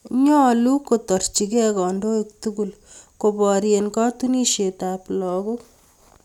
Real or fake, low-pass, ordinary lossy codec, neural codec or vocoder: real; 19.8 kHz; none; none